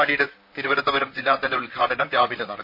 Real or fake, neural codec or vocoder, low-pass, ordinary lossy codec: fake; vocoder, 44.1 kHz, 128 mel bands, Pupu-Vocoder; 5.4 kHz; none